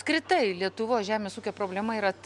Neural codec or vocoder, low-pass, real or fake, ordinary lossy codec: none; 10.8 kHz; real; MP3, 96 kbps